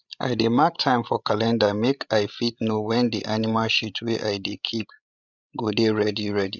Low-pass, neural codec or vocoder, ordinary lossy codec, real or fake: 7.2 kHz; codec, 16 kHz, 16 kbps, FreqCodec, larger model; none; fake